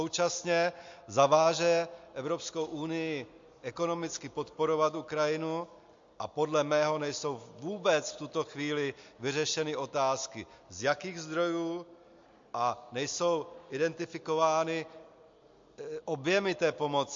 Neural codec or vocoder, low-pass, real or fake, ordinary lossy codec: none; 7.2 kHz; real; MP3, 48 kbps